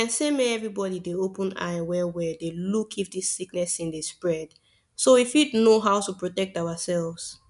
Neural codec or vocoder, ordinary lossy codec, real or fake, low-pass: none; none; real; 10.8 kHz